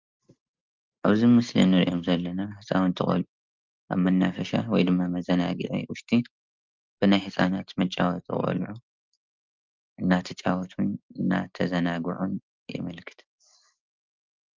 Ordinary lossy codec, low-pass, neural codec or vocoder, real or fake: Opus, 24 kbps; 7.2 kHz; none; real